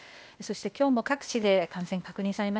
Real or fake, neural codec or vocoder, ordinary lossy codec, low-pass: fake; codec, 16 kHz, 0.8 kbps, ZipCodec; none; none